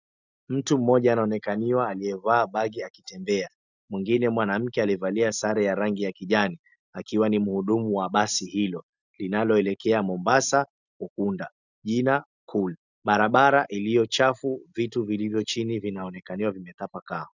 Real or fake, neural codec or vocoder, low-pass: real; none; 7.2 kHz